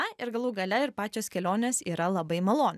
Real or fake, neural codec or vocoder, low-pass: real; none; 14.4 kHz